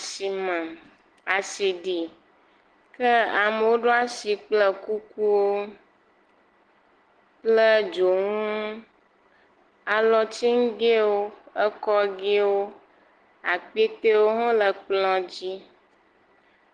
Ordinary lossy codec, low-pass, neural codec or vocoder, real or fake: Opus, 16 kbps; 9.9 kHz; none; real